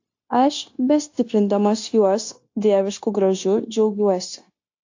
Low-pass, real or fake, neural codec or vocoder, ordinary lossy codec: 7.2 kHz; fake; codec, 16 kHz, 0.9 kbps, LongCat-Audio-Codec; AAC, 48 kbps